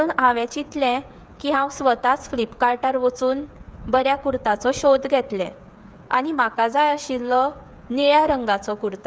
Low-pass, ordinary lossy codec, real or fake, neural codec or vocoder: none; none; fake; codec, 16 kHz, 8 kbps, FreqCodec, smaller model